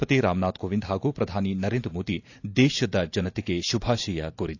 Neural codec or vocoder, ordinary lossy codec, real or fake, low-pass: none; AAC, 48 kbps; real; 7.2 kHz